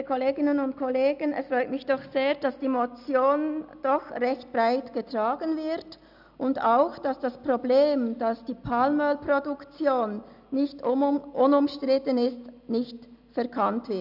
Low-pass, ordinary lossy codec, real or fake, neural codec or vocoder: 5.4 kHz; none; real; none